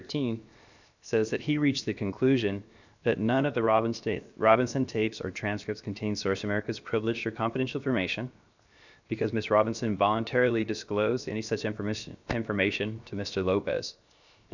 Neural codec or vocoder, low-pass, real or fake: codec, 16 kHz, 0.7 kbps, FocalCodec; 7.2 kHz; fake